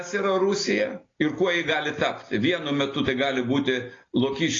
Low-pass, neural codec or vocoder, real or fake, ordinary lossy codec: 7.2 kHz; none; real; AAC, 32 kbps